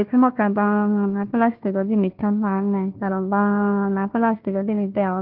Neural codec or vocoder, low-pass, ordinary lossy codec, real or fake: codec, 16 kHz, 1 kbps, FunCodec, trained on Chinese and English, 50 frames a second; 5.4 kHz; Opus, 16 kbps; fake